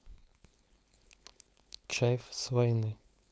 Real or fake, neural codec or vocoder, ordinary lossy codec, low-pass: fake; codec, 16 kHz, 4.8 kbps, FACodec; none; none